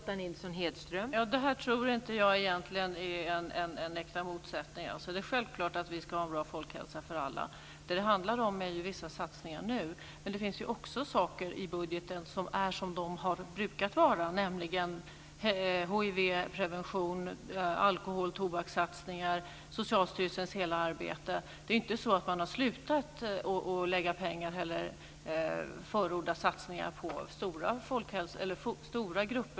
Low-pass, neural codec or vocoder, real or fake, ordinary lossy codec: none; none; real; none